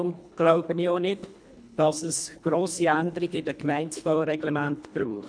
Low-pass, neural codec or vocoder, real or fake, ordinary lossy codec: 9.9 kHz; codec, 24 kHz, 1.5 kbps, HILCodec; fake; none